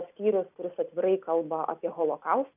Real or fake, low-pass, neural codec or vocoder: real; 3.6 kHz; none